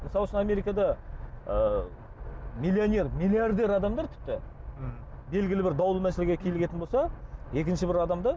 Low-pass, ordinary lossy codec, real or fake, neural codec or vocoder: none; none; real; none